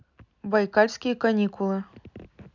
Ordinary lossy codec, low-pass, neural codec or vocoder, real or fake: none; 7.2 kHz; none; real